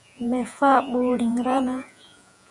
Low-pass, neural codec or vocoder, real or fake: 10.8 kHz; vocoder, 48 kHz, 128 mel bands, Vocos; fake